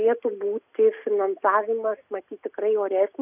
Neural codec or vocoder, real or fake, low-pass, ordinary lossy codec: none; real; 3.6 kHz; AAC, 32 kbps